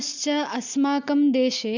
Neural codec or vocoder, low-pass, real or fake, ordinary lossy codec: none; 7.2 kHz; real; none